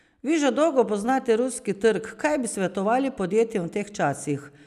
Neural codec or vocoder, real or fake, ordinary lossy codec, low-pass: none; real; none; 14.4 kHz